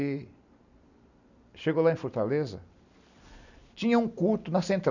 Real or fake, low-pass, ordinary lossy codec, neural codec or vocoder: real; 7.2 kHz; none; none